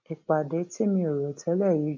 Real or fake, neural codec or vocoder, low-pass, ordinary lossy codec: real; none; 7.2 kHz; MP3, 48 kbps